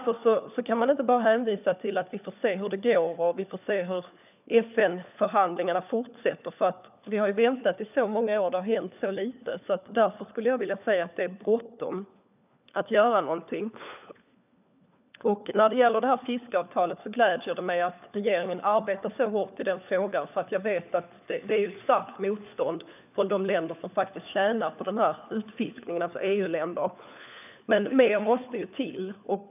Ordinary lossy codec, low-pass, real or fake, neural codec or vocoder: none; 3.6 kHz; fake; codec, 16 kHz, 4 kbps, FunCodec, trained on LibriTTS, 50 frames a second